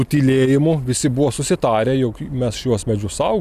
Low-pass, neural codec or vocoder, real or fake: 14.4 kHz; none; real